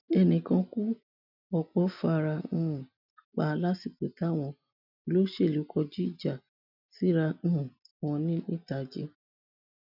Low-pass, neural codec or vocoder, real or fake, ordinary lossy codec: 5.4 kHz; none; real; none